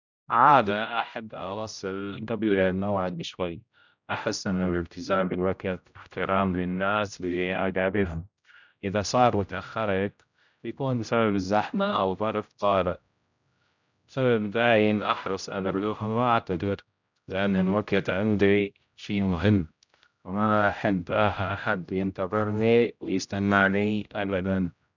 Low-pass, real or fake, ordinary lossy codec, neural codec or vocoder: 7.2 kHz; fake; none; codec, 16 kHz, 0.5 kbps, X-Codec, HuBERT features, trained on general audio